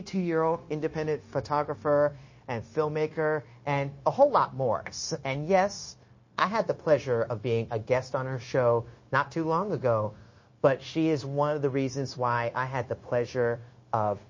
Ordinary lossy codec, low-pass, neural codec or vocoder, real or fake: MP3, 32 kbps; 7.2 kHz; codec, 16 kHz, 0.9 kbps, LongCat-Audio-Codec; fake